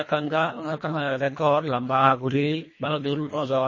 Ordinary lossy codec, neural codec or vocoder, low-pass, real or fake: MP3, 32 kbps; codec, 24 kHz, 1.5 kbps, HILCodec; 7.2 kHz; fake